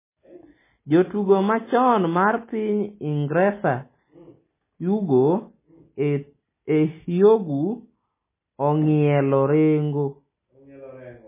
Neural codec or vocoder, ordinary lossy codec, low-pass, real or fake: none; MP3, 16 kbps; 3.6 kHz; real